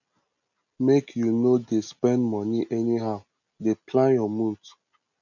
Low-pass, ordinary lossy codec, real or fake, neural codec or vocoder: 7.2 kHz; none; real; none